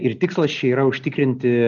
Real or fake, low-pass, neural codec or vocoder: real; 7.2 kHz; none